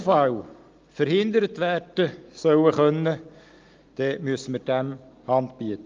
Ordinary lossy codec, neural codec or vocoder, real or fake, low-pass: Opus, 24 kbps; none; real; 7.2 kHz